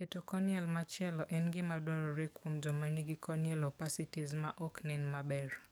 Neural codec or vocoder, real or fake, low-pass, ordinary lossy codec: codec, 44.1 kHz, 7.8 kbps, DAC; fake; none; none